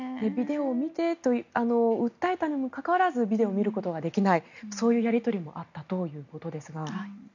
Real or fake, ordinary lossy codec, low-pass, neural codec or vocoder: real; none; 7.2 kHz; none